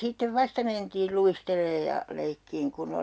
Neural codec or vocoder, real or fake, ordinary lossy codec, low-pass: none; real; none; none